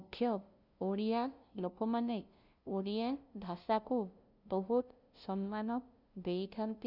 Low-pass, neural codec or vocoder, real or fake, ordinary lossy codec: 5.4 kHz; codec, 16 kHz, 0.5 kbps, FunCodec, trained on LibriTTS, 25 frames a second; fake; Opus, 64 kbps